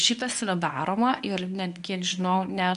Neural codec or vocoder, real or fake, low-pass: codec, 24 kHz, 0.9 kbps, WavTokenizer, medium speech release version 1; fake; 10.8 kHz